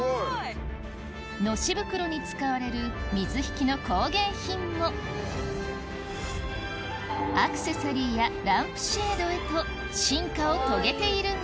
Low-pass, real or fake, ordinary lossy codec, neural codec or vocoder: none; real; none; none